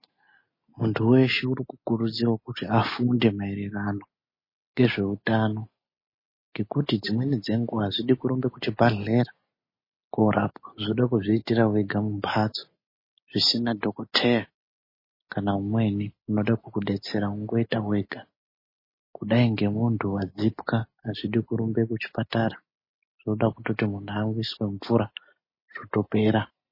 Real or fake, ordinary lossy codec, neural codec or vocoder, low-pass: real; MP3, 24 kbps; none; 5.4 kHz